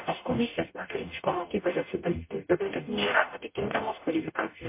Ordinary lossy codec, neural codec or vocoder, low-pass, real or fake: MP3, 24 kbps; codec, 44.1 kHz, 0.9 kbps, DAC; 3.6 kHz; fake